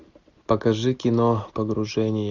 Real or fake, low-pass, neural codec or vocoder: real; 7.2 kHz; none